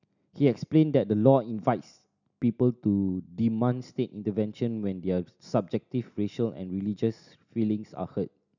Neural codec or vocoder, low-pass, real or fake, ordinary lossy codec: none; 7.2 kHz; real; none